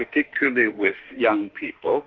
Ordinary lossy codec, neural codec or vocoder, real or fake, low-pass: Opus, 32 kbps; autoencoder, 48 kHz, 32 numbers a frame, DAC-VAE, trained on Japanese speech; fake; 7.2 kHz